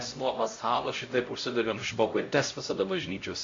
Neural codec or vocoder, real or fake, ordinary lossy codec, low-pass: codec, 16 kHz, 0.5 kbps, X-Codec, HuBERT features, trained on LibriSpeech; fake; AAC, 48 kbps; 7.2 kHz